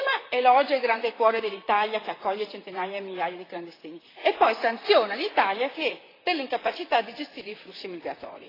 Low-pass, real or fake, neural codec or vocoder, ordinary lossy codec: 5.4 kHz; fake; vocoder, 44.1 kHz, 128 mel bands, Pupu-Vocoder; AAC, 24 kbps